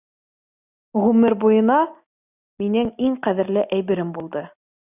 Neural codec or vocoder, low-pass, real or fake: none; 3.6 kHz; real